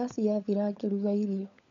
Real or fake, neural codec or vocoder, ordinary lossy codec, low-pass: fake; codec, 16 kHz, 16 kbps, FunCodec, trained on LibriTTS, 50 frames a second; MP3, 48 kbps; 7.2 kHz